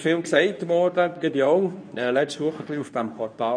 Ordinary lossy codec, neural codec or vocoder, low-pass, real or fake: none; codec, 24 kHz, 0.9 kbps, WavTokenizer, medium speech release version 2; 9.9 kHz; fake